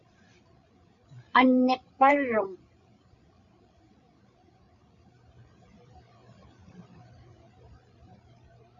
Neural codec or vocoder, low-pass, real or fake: codec, 16 kHz, 8 kbps, FreqCodec, larger model; 7.2 kHz; fake